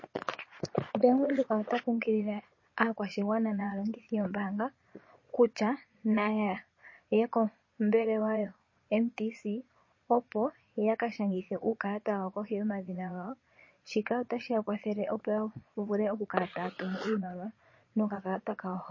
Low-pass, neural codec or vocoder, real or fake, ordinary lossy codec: 7.2 kHz; vocoder, 22.05 kHz, 80 mel bands, Vocos; fake; MP3, 32 kbps